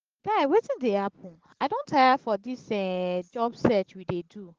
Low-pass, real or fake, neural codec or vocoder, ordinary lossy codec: 7.2 kHz; real; none; Opus, 16 kbps